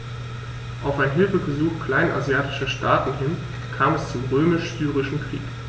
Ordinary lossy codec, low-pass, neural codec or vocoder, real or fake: none; none; none; real